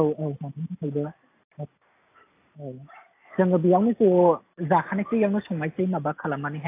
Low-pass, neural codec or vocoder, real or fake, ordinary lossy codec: 3.6 kHz; none; real; AAC, 24 kbps